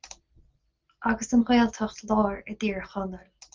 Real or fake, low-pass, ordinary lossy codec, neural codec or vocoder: real; 7.2 kHz; Opus, 24 kbps; none